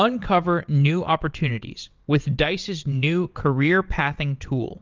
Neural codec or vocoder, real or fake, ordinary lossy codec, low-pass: vocoder, 22.05 kHz, 80 mel bands, WaveNeXt; fake; Opus, 24 kbps; 7.2 kHz